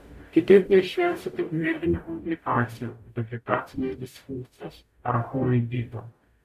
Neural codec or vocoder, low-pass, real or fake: codec, 44.1 kHz, 0.9 kbps, DAC; 14.4 kHz; fake